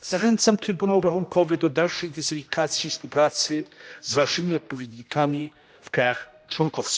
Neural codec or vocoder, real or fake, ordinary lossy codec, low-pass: codec, 16 kHz, 1 kbps, X-Codec, HuBERT features, trained on general audio; fake; none; none